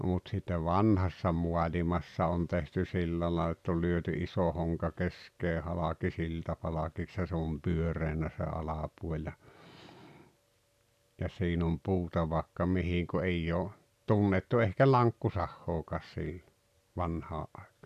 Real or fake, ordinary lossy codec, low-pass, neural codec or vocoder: real; none; 14.4 kHz; none